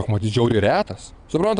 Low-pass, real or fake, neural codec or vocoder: 9.9 kHz; fake; vocoder, 22.05 kHz, 80 mel bands, WaveNeXt